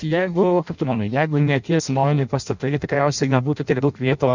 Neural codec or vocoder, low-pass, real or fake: codec, 16 kHz in and 24 kHz out, 0.6 kbps, FireRedTTS-2 codec; 7.2 kHz; fake